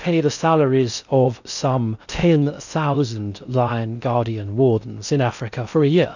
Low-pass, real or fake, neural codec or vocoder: 7.2 kHz; fake; codec, 16 kHz in and 24 kHz out, 0.6 kbps, FocalCodec, streaming, 4096 codes